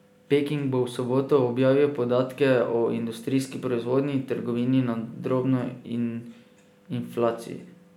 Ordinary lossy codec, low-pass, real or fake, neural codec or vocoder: none; 19.8 kHz; real; none